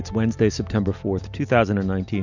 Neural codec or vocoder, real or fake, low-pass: none; real; 7.2 kHz